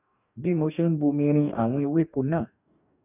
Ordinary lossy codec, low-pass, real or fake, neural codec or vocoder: none; 3.6 kHz; fake; codec, 44.1 kHz, 2.6 kbps, DAC